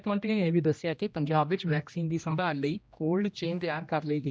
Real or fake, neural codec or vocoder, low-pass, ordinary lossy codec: fake; codec, 16 kHz, 1 kbps, X-Codec, HuBERT features, trained on general audio; none; none